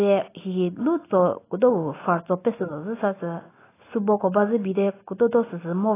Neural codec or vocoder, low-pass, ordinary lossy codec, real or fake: none; 3.6 kHz; AAC, 16 kbps; real